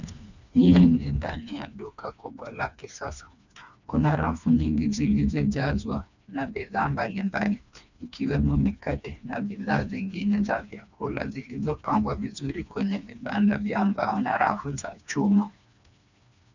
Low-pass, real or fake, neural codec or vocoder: 7.2 kHz; fake; codec, 16 kHz, 2 kbps, FreqCodec, smaller model